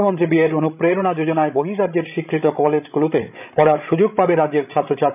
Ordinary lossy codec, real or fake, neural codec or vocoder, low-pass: none; fake; codec, 16 kHz, 16 kbps, FreqCodec, larger model; 3.6 kHz